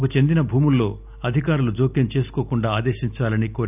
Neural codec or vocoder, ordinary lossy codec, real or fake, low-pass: none; none; real; 3.6 kHz